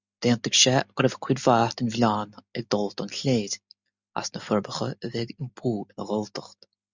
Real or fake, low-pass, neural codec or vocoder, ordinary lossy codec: real; 7.2 kHz; none; Opus, 64 kbps